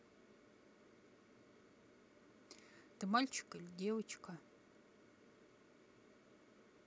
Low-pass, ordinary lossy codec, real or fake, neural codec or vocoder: none; none; real; none